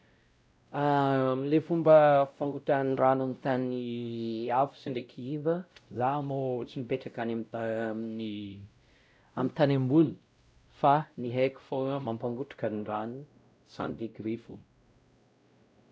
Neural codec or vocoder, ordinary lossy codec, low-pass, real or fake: codec, 16 kHz, 0.5 kbps, X-Codec, WavLM features, trained on Multilingual LibriSpeech; none; none; fake